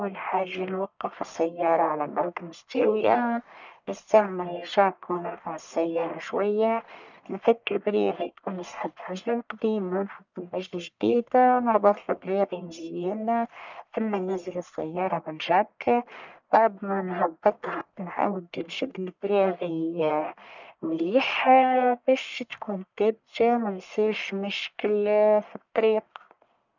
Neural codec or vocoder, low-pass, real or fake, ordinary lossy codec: codec, 44.1 kHz, 1.7 kbps, Pupu-Codec; 7.2 kHz; fake; none